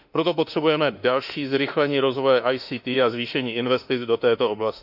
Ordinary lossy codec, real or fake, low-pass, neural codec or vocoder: none; fake; 5.4 kHz; autoencoder, 48 kHz, 32 numbers a frame, DAC-VAE, trained on Japanese speech